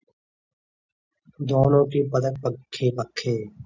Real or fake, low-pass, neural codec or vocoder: real; 7.2 kHz; none